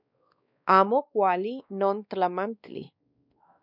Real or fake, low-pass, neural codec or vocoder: fake; 5.4 kHz; codec, 16 kHz, 2 kbps, X-Codec, WavLM features, trained on Multilingual LibriSpeech